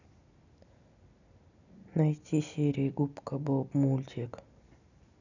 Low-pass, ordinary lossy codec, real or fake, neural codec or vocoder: 7.2 kHz; none; real; none